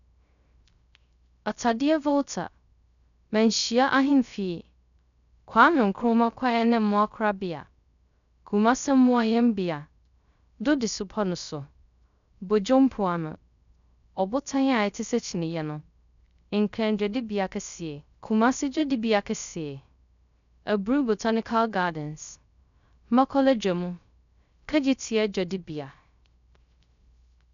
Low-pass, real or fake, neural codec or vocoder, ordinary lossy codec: 7.2 kHz; fake; codec, 16 kHz, 0.3 kbps, FocalCodec; none